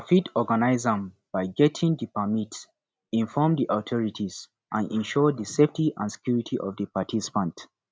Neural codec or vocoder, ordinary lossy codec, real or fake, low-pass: none; none; real; none